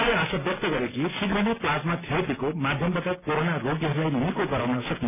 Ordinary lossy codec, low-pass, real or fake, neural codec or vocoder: none; 3.6 kHz; real; none